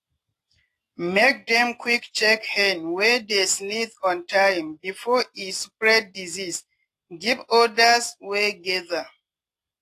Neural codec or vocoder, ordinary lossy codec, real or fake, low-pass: none; AAC, 48 kbps; real; 14.4 kHz